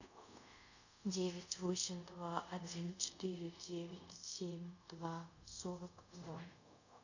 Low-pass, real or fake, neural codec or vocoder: 7.2 kHz; fake; codec, 24 kHz, 0.5 kbps, DualCodec